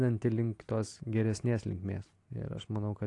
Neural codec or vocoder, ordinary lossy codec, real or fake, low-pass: vocoder, 44.1 kHz, 128 mel bands every 256 samples, BigVGAN v2; AAC, 48 kbps; fake; 10.8 kHz